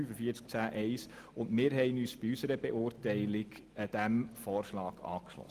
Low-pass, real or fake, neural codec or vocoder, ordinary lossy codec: 14.4 kHz; real; none; Opus, 24 kbps